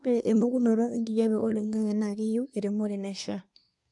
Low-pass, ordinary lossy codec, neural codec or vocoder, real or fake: 10.8 kHz; none; codec, 24 kHz, 1 kbps, SNAC; fake